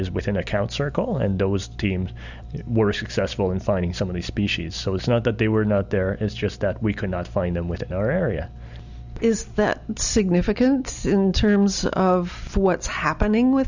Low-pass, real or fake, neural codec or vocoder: 7.2 kHz; real; none